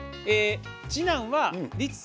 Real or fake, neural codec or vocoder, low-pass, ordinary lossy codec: real; none; none; none